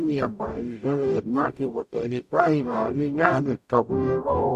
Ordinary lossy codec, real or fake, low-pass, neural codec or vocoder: none; fake; 14.4 kHz; codec, 44.1 kHz, 0.9 kbps, DAC